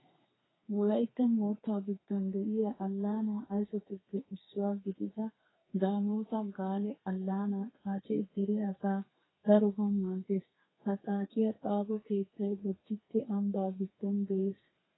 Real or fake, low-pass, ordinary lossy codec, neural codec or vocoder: fake; 7.2 kHz; AAC, 16 kbps; codec, 32 kHz, 1.9 kbps, SNAC